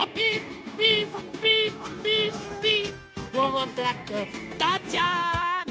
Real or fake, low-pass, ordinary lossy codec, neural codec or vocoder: fake; none; none; codec, 16 kHz, 0.9 kbps, LongCat-Audio-Codec